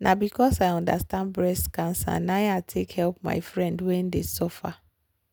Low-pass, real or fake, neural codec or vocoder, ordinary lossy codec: none; real; none; none